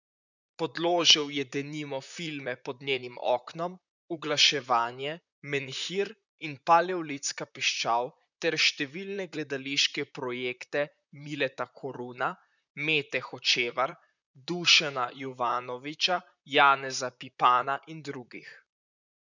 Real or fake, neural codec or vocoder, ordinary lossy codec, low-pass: fake; vocoder, 44.1 kHz, 128 mel bands, Pupu-Vocoder; none; 7.2 kHz